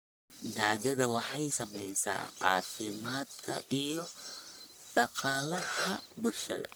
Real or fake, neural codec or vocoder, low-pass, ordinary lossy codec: fake; codec, 44.1 kHz, 1.7 kbps, Pupu-Codec; none; none